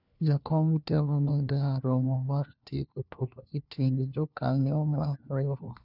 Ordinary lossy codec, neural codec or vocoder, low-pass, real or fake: none; codec, 16 kHz, 1 kbps, FunCodec, trained on LibriTTS, 50 frames a second; 5.4 kHz; fake